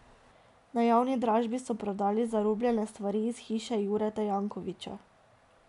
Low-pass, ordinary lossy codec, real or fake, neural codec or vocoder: 10.8 kHz; none; real; none